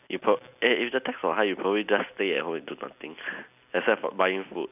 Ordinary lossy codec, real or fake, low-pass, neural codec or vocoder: none; real; 3.6 kHz; none